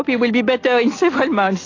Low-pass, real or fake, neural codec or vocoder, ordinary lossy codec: 7.2 kHz; real; none; AAC, 32 kbps